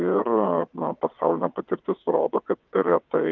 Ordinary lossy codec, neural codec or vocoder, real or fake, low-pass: Opus, 24 kbps; vocoder, 22.05 kHz, 80 mel bands, WaveNeXt; fake; 7.2 kHz